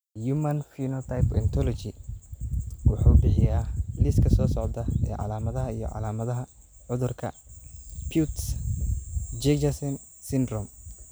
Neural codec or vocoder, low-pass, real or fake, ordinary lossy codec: none; none; real; none